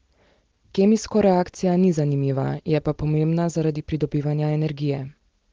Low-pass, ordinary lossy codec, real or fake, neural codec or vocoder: 7.2 kHz; Opus, 16 kbps; real; none